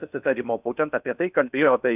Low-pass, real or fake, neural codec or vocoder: 3.6 kHz; fake; codec, 16 kHz in and 24 kHz out, 0.6 kbps, FocalCodec, streaming, 4096 codes